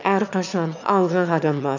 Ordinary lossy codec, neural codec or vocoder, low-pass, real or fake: none; autoencoder, 22.05 kHz, a latent of 192 numbers a frame, VITS, trained on one speaker; 7.2 kHz; fake